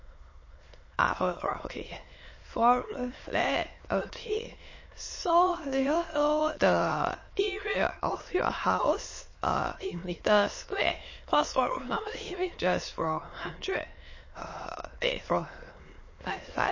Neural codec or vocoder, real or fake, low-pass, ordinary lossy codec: autoencoder, 22.05 kHz, a latent of 192 numbers a frame, VITS, trained on many speakers; fake; 7.2 kHz; MP3, 32 kbps